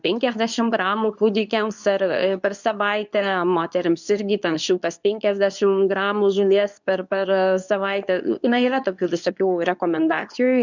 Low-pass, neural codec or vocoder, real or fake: 7.2 kHz; codec, 24 kHz, 0.9 kbps, WavTokenizer, medium speech release version 2; fake